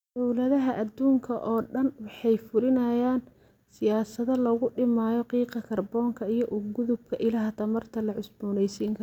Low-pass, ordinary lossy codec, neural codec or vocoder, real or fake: 19.8 kHz; none; none; real